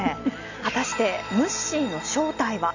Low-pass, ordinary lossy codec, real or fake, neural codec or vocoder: 7.2 kHz; none; real; none